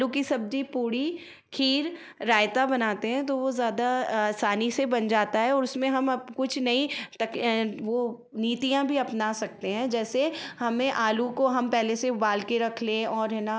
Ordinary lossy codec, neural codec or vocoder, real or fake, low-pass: none; none; real; none